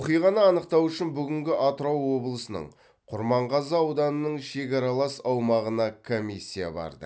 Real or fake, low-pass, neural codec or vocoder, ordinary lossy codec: real; none; none; none